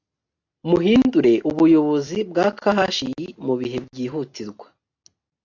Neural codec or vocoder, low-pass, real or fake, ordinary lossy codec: none; 7.2 kHz; real; AAC, 48 kbps